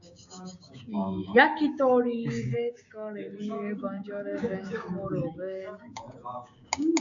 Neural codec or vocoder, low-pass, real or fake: codec, 16 kHz, 6 kbps, DAC; 7.2 kHz; fake